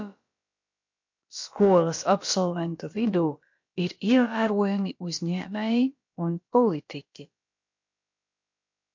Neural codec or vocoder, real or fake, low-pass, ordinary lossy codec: codec, 16 kHz, about 1 kbps, DyCAST, with the encoder's durations; fake; 7.2 kHz; MP3, 48 kbps